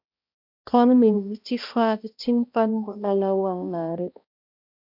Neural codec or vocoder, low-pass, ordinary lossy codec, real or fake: codec, 16 kHz, 1 kbps, X-Codec, HuBERT features, trained on balanced general audio; 5.4 kHz; MP3, 48 kbps; fake